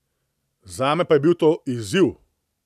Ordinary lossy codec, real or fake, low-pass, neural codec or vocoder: none; real; 14.4 kHz; none